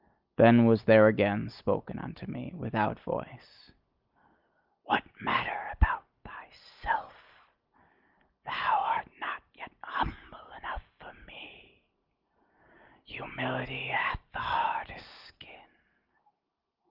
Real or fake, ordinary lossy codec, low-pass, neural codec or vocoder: real; Opus, 32 kbps; 5.4 kHz; none